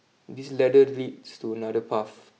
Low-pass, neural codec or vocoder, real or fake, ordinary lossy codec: none; none; real; none